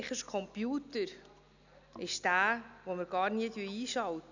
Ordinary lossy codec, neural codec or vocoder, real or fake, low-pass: none; none; real; 7.2 kHz